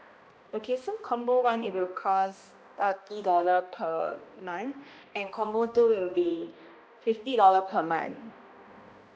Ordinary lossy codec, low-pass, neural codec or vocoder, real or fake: none; none; codec, 16 kHz, 1 kbps, X-Codec, HuBERT features, trained on balanced general audio; fake